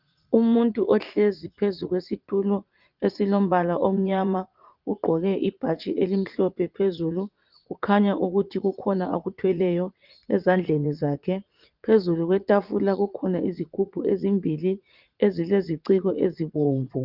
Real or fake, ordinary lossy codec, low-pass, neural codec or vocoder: fake; Opus, 24 kbps; 5.4 kHz; vocoder, 44.1 kHz, 80 mel bands, Vocos